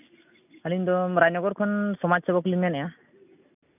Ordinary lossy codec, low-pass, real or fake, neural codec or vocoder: none; 3.6 kHz; real; none